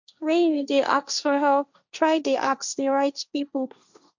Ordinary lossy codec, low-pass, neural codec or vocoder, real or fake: none; 7.2 kHz; codec, 16 kHz, 1.1 kbps, Voila-Tokenizer; fake